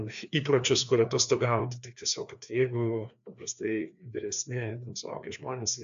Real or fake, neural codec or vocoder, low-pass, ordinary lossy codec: fake; codec, 16 kHz, 2 kbps, FreqCodec, larger model; 7.2 kHz; AAC, 96 kbps